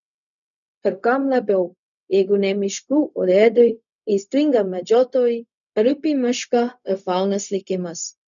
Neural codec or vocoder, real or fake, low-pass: codec, 16 kHz, 0.4 kbps, LongCat-Audio-Codec; fake; 7.2 kHz